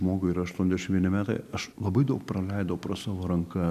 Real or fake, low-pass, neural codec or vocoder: real; 14.4 kHz; none